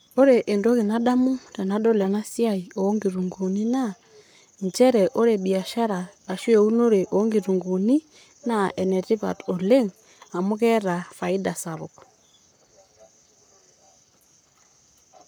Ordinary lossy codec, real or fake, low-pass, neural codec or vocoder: none; fake; none; codec, 44.1 kHz, 7.8 kbps, Pupu-Codec